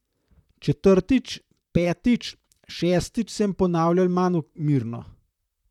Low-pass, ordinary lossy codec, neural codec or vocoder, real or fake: 19.8 kHz; none; vocoder, 44.1 kHz, 128 mel bands, Pupu-Vocoder; fake